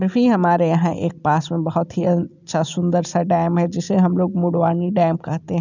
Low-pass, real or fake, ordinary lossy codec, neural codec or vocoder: 7.2 kHz; real; none; none